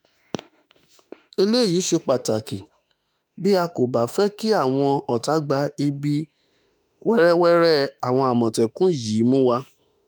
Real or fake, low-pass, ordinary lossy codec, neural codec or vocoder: fake; none; none; autoencoder, 48 kHz, 32 numbers a frame, DAC-VAE, trained on Japanese speech